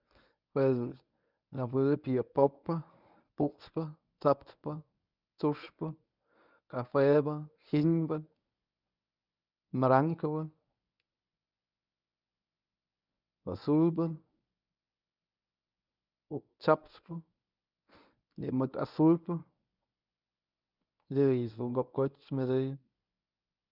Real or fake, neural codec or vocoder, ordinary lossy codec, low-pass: fake; codec, 24 kHz, 0.9 kbps, WavTokenizer, medium speech release version 1; none; 5.4 kHz